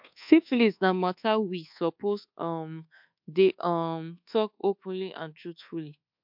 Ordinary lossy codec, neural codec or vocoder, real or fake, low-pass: MP3, 48 kbps; codec, 24 kHz, 1.2 kbps, DualCodec; fake; 5.4 kHz